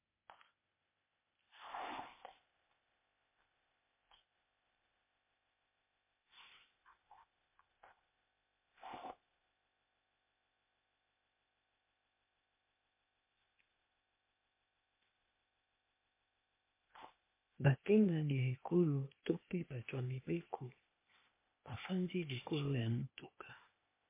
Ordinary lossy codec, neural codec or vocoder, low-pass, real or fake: MP3, 24 kbps; codec, 16 kHz, 0.8 kbps, ZipCodec; 3.6 kHz; fake